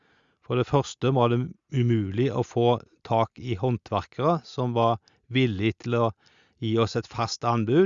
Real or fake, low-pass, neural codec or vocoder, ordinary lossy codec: real; 7.2 kHz; none; Opus, 64 kbps